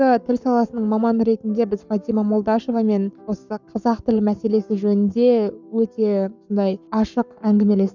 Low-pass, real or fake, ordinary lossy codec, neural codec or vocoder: 7.2 kHz; fake; none; codec, 44.1 kHz, 7.8 kbps, Pupu-Codec